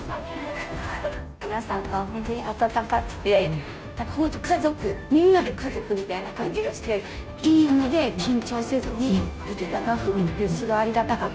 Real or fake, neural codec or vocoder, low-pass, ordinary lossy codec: fake; codec, 16 kHz, 0.5 kbps, FunCodec, trained on Chinese and English, 25 frames a second; none; none